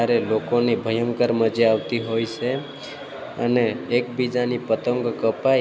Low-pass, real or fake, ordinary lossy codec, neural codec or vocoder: none; real; none; none